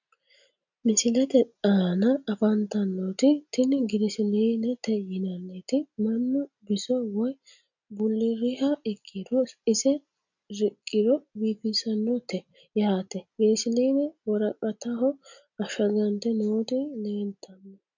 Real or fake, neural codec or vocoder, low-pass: real; none; 7.2 kHz